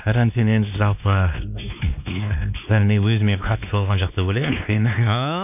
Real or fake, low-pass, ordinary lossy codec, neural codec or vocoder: fake; 3.6 kHz; none; codec, 16 kHz, 2 kbps, X-Codec, WavLM features, trained on Multilingual LibriSpeech